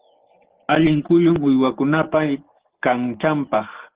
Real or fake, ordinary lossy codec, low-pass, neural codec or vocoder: real; Opus, 16 kbps; 3.6 kHz; none